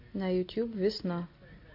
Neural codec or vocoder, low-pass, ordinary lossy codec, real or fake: none; 5.4 kHz; MP3, 32 kbps; real